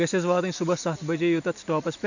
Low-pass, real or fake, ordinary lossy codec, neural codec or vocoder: 7.2 kHz; real; none; none